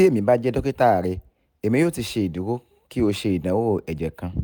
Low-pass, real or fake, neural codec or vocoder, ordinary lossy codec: none; real; none; none